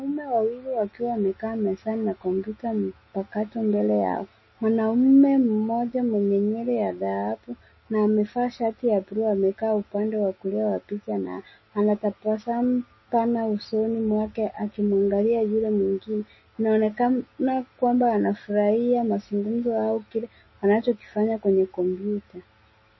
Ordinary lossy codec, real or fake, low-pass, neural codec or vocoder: MP3, 24 kbps; real; 7.2 kHz; none